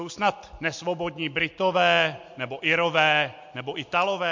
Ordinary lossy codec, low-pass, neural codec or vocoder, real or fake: MP3, 48 kbps; 7.2 kHz; none; real